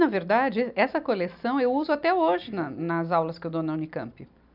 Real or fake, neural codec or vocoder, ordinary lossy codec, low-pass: real; none; none; 5.4 kHz